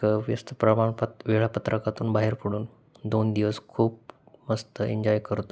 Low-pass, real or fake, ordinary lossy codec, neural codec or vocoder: none; real; none; none